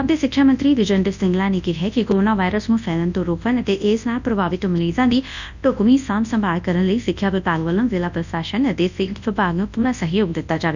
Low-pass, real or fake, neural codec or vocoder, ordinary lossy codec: 7.2 kHz; fake; codec, 24 kHz, 0.9 kbps, WavTokenizer, large speech release; none